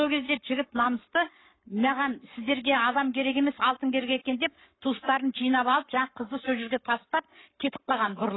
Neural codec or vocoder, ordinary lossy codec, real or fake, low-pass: codec, 44.1 kHz, 7.8 kbps, Pupu-Codec; AAC, 16 kbps; fake; 7.2 kHz